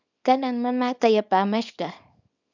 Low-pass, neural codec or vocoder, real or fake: 7.2 kHz; codec, 24 kHz, 0.9 kbps, WavTokenizer, small release; fake